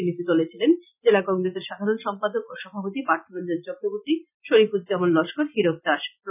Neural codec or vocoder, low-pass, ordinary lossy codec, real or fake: none; 3.6 kHz; none; real